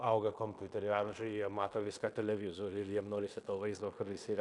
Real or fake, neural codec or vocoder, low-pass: fake; codec, 16 kHz in and 24 kHz out, 0.9 kbps, LongCat-Audio-Codec, fine tuned four codebook decoder; 10.8 kHz